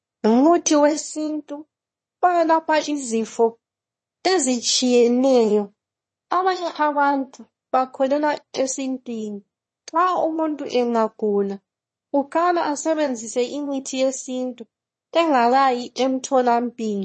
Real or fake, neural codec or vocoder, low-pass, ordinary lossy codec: fake; autoencoder, 22.05 kHz, a latent of 192 numbers a frame, VITS, trained on one speaker; 9.9 kHz; MP3, 32 kbps